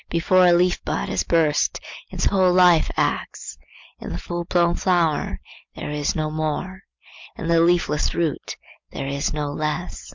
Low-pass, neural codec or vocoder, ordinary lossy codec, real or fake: 7.2 kHz; none; MP3, 64 kbps; real